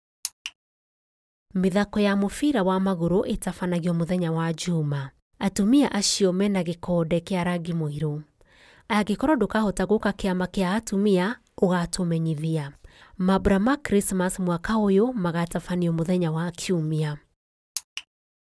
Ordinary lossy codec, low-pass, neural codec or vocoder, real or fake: none; none; none; real